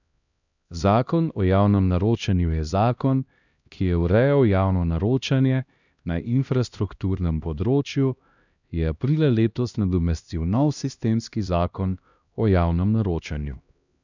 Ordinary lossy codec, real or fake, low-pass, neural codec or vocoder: none; fake; 7.2 kHz; codec, 16 kHz, 1 kbps, X-Codec, HuBERT features, trained on LibriSpeech